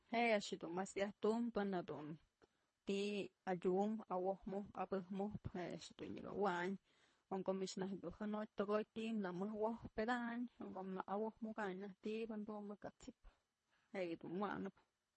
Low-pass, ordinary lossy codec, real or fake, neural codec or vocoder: 10.8 kHz; MP3, 32 kbps; fake; codec, 24 kHz, 3 kbps, HILCodec